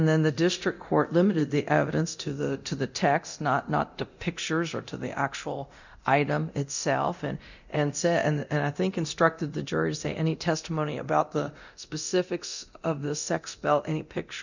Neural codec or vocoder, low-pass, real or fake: codec, 24 kHz, 0.9 kbps, DualCodec; 7.2 kHz; fake